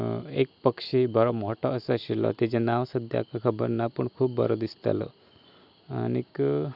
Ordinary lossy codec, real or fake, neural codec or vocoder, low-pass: none; real; none; 5.4 kHz